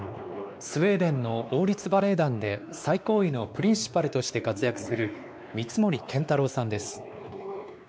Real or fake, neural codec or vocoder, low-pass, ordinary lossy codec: fake; codec, 16 kHz, 2 kbps, X-Codec, WavLM features, trained on Multilingual LibriSpeech; none; none